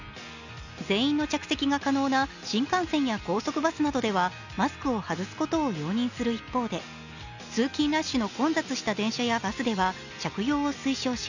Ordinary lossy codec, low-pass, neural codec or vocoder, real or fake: none; 7.2 kHz; none; real